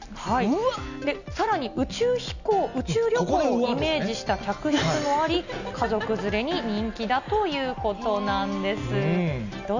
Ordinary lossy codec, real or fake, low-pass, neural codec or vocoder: none; real; 7.2 kHz; none